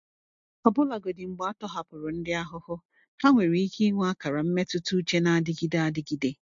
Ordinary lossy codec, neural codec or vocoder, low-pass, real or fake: MP3, 48 kbps; none; 7.2 kHz; real